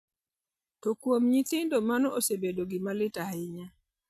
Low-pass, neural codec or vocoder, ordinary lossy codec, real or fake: 14.4 kHz; none; none; real